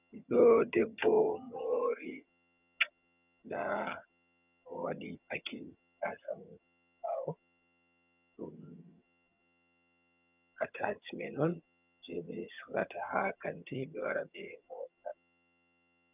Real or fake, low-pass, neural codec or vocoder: fake; 3.6 kHz; vocoder, 22.05 kHz, 80 mel bands, HiFi-GAN